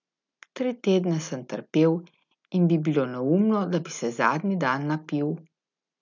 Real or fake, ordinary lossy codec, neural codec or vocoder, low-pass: real; none; none; 7.2 kHz